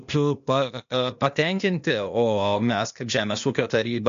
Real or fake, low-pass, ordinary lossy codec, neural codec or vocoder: fake; 7.2 kHz; MP3, 48 kbps; codec, 16 kHz, 0.8 kbps, ZipCodec